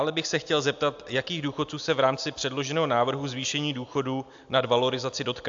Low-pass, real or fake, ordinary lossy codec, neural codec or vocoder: 7.2 kHz; real; AAC, 64 kbps; none